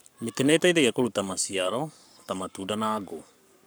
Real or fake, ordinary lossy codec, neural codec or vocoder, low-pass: fake; none; codec, 44.1 kHz, 7.8 kbps, Pupu-Codec; none